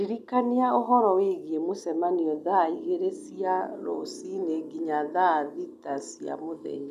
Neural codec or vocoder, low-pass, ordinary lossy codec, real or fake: none; 14.4 kHz; none; real